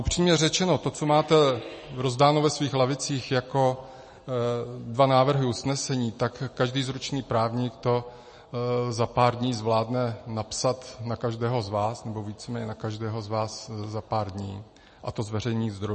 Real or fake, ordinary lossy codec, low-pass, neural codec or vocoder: real; MP3, 32 kbps; 10.8 kHz; none